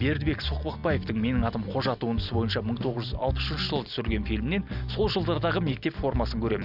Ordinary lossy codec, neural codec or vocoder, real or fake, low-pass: none; none; real; 5.4 kHz